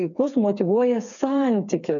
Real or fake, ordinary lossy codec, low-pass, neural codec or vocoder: fake; MP3, 96 kbps; 7.2 kHz; codec, 16 kHz, 4 kbps, FreqCodec, smaller model